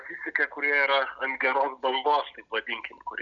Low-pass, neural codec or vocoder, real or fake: 7.2 kHz; none; real